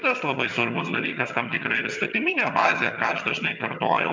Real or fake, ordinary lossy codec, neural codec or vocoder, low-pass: fake; MP3, 64 kbps; vocoder, 22.05 kHz, 80 mel bands, HiFi-GAN; 7.2 kHz